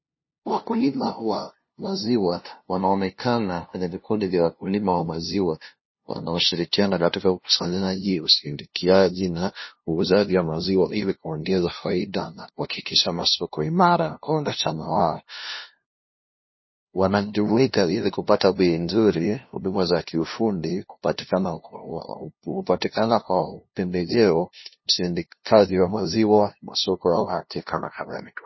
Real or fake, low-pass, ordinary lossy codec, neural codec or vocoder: fake; 7.2 kHz; MP3, 24 kbps; codec, 16 kHz, 0.5 kbps, FunCodec, trained on LibriTTS, 25 frames a second